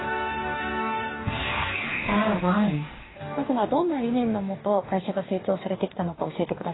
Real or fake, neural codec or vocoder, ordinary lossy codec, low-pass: fake; codec, 44.1 kHz, 2.6 kbps, DAC; AAC, 16 kbps; 7.2 kHz